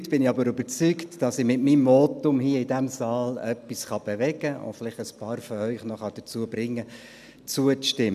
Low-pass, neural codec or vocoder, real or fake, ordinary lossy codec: 14.4 kHz; none; real; none